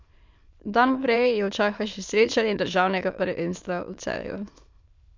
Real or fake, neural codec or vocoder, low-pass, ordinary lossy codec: fake; autoencoder, 22.05 kHz, a latent of 192 numbers a frame, VITS, trained on many speakers; 7.2 kHz; MP3, 64 kbps